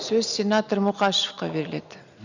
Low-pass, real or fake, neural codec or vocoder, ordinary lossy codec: 7.2 kHz; real; none; none